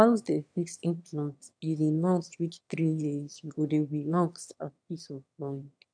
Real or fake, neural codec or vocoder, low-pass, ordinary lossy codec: fake; autoencoder, 22.05 kHz, a latent of 192 numbers a frame, VITS, trained on one speaker; 9.9 kHz; none